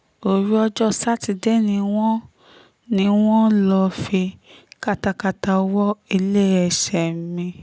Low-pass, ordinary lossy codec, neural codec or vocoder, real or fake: none; none; none; real